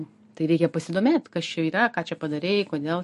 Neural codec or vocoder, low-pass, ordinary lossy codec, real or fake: none; 14.4 kHz; MP3, 48 kbps; real